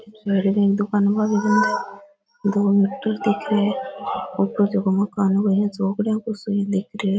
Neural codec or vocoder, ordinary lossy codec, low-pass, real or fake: none; none; none; real